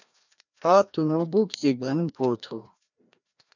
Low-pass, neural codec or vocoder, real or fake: 7.2 kHz; codec, 16 kHz, 1 kbps, FreqCodec, larger model; fake